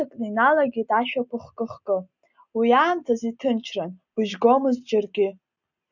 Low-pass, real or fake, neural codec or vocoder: 7.2 kHz; real; none